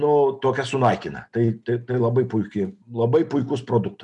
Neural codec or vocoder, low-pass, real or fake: none; 10.8 kHz; real